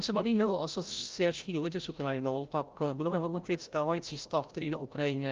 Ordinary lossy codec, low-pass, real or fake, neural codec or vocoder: Opus, 32 kbps; 7.2 kHz; fake; codec, 16 kHz, 0.5 kbps, FreqCodec, larger model